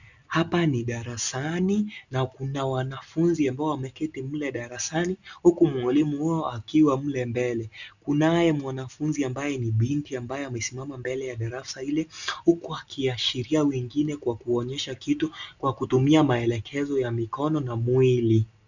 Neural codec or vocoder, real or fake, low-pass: none; real; 7.2 kHz